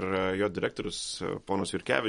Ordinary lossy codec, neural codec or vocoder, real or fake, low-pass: MP3, 48 kbps; none; real; 19.8 kHz